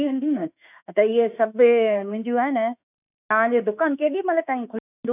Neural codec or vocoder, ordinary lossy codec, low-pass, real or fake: autoencoder, 48 kHz, 32 numbers a frame, DAC-VAE, trained on Japanese speech; none; 3.6 kHz; fake